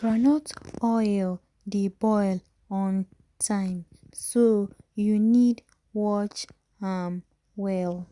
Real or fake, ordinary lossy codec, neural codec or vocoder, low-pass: real; Opus, 64 kbps; none; 10.8 kHz